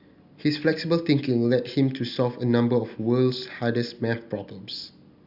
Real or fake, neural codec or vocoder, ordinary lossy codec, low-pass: real; none; Opus, 64 kbps; 5.4 kHz